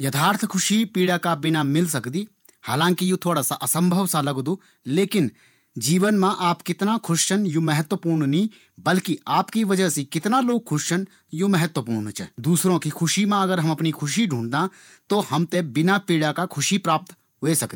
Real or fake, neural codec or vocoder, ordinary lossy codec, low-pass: fake; vocoder, 48 kHz, 128 mel bands, Vocos; none; 19.8 kHz